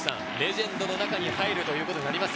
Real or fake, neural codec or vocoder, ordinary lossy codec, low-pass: real; none; none; none